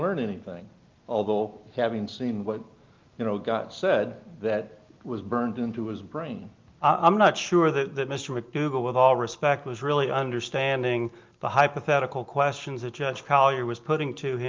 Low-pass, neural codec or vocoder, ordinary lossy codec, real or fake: 7.2 kHz; none; Opus, 32 kbps; real